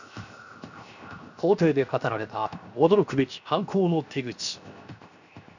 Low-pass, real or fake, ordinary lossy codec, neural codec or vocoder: 7.2 kHz; fake; none; codec, 16 kHz, 0.7 kbps, FocalCodec